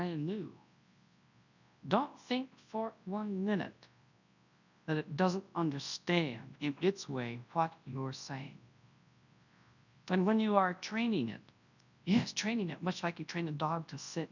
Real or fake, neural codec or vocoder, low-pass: fake; codec, 24 kHz, 0.9 kbps, WavTokenizer, large speech release; 7.2 kHz